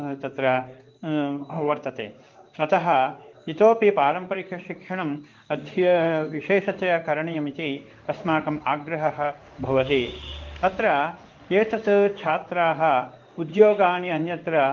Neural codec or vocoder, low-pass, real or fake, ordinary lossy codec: codec, 16 kHz in and 24 kHz out, 1 kbps, XY-Tokenizer; 7.2 kHz; fake; Opus, 32 kbps